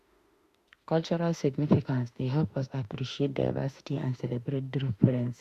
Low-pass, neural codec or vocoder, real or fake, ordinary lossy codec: 14.4 kHz; autoencoder, 48 kHz, 32 numbers a frame, DAC-VAE, trained on Japanese speech; fake; Opus, 64 kbps